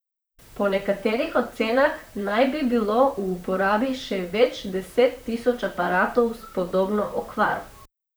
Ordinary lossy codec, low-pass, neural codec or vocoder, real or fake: none; none; vocoder, 44.1 kHz, 128 mel bands, Pupu-Vocoder; fake